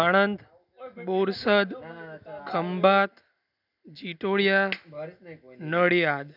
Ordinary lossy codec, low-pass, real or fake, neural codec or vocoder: none; 5.4 kHz; real; none